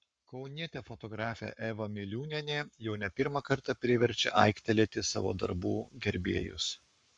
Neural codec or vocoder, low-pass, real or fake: codec, 44.1 kHz, 7.8 kbps, Pupu-Codec; 9.9 kHz; fake